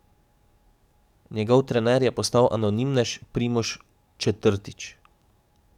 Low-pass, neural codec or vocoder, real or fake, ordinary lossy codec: 19.8 kHz; codec, 44.1 kHz, 7.8 kbps, DAC; fake; none